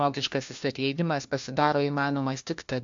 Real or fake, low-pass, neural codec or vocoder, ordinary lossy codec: fake; 7.2 kHz; codec, 16 kHz, 1 kbps, FunCodec, trained on LibriTTS, 50 frames a second; AAC, 64 kbps